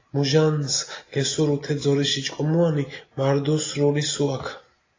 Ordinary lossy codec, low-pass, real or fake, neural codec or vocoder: AAC, 32 kbps; 7.2 kHz; real; none